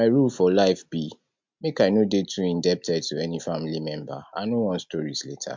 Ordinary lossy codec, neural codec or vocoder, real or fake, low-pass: MP3, 64 kbps; none; real; 7.2 kHz